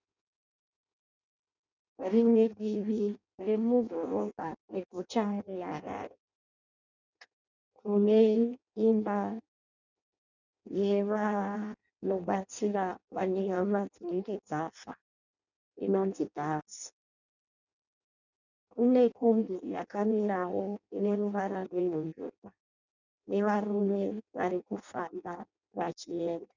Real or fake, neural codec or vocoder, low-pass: fake; codec, 16 kHz in and 24 kHz out, 0.6 kbps, FireRedTTS-2 codec; 7.2 kHz